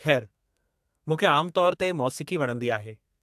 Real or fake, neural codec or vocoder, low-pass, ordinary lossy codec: fake; codec, 32 kHz, 1.9 kbps, SNAC; 14.4 kHz; AAC, 96 kbps